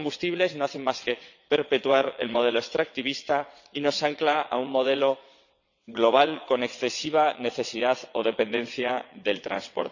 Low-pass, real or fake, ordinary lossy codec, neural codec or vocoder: 7.2 kHz; fake; none; vocoder, 22.05 kHz, 80 mel bands, WaveNeXt